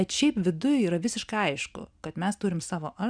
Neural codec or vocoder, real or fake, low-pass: none; real; 9.9 kHz